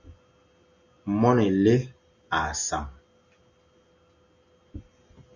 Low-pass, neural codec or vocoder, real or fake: 7.2 kHz; none; real